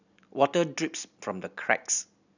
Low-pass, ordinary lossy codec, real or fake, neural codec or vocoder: 7.2 kHz; none; real; none